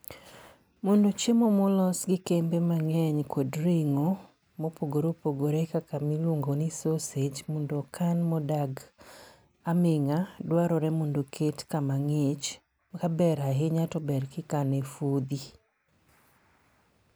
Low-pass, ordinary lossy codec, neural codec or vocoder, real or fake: none; none; none; real